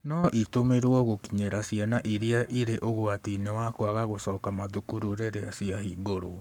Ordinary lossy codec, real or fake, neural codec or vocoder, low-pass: none; fake; codec, 44.1 kHz, 7.8 kbps, Pupu-Codec; 19.8 kHz